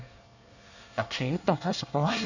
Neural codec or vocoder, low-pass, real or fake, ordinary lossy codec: codec, 24 kHz, 1 kbps, SNAC; 7.2 kHz; fake; none